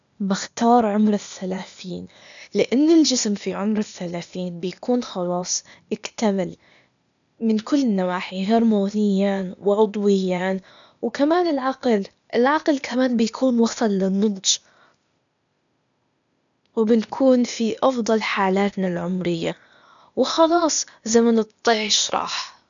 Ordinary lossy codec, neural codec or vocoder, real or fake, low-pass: none; codec, 16 kHz, 0.8 kbps, ZipCodec; fake; 7.2 kHz